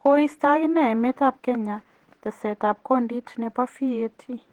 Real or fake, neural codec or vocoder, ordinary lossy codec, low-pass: fake; vocoder, 48 kHz, 128 mel bands, Vocos; Opus, 16 kbps; 19.8 kHz